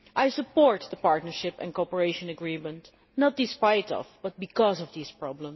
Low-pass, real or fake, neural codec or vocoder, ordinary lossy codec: 7.2 kHz; real; none; MP3, 24 kbps